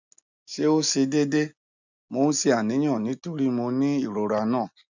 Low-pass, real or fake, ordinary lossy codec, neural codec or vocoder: 7.2 kHz; real; none; none